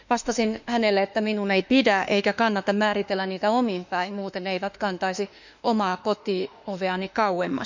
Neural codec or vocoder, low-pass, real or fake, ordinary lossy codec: autoencoder, 48 kHz, 32 numbers a frame, DAC-VAE, trained on Japanese speech; 7.2 kHz; fake; none